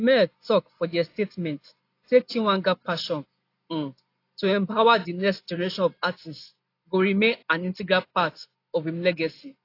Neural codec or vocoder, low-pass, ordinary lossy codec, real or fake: none; 5.4 kHz; AAC, 32 kbps; real